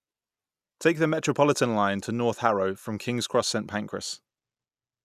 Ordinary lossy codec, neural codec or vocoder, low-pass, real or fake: none; none; 14.4 kHz; real